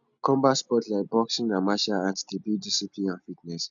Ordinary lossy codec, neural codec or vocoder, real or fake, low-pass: none; none; real; 7.2 kHz